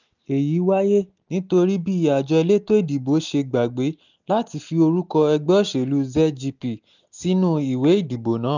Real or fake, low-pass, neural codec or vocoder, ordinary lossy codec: real; 7.2 kHz; none; none